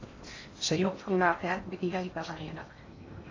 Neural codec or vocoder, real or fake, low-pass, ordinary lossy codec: codec, 16 kHz in and 24 kHz out, 0.6 kbps, FocalCodec, streaming, 4096 codes; fake; 7.2 kHz; AAC, 32 kbps